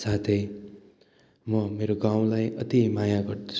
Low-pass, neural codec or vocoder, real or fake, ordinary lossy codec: none; none; real; none